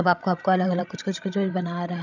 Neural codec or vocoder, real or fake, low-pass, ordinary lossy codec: codec, 16 kHz, 16 kbps, FreqCodec, larger model; fake; 7.2 kHz; none